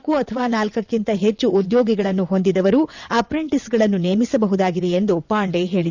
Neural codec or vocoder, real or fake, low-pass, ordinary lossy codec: vocoder, 22.05 kHz, 80 mel bands, WaveNeXt; fake; 7.2 kHz; none